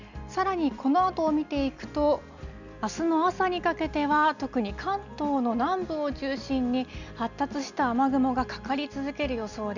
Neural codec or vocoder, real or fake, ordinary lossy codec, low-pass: none; real; none; 7.2 kHz